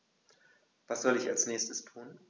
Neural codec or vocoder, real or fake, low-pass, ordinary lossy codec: none; real; none; none